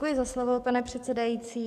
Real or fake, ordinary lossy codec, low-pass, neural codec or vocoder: fake; AAC, 96 kbps; 14.4 kHz; codec, 44.1 kHz, 7.8 kbps, DAC